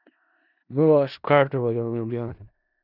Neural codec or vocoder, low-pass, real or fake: codec, 16 kHz in and 24 kHz out, 0.4 kbps, LongCat-Audio-Codec, four codebook decoder; 5.4 kHz; fake